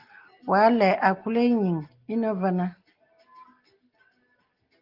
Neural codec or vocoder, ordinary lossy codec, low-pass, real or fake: none; Opus, 32 kbps; 5.4 kHz; real